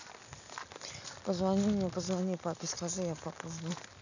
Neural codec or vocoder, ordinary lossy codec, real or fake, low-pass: none; none; real; 7.2 kHz